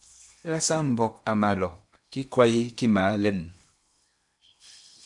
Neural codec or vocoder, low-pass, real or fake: codec, 16 kHz in and 24 kHz out, 0.8 kbps, FocalCodec, streaming, 65536 codes; 10.8 kHz; fake